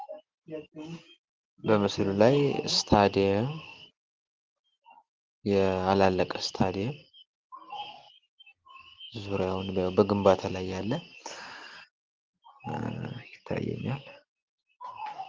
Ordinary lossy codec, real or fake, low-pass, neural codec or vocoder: Opus, 16 kbps; real; 7.2 kHz; none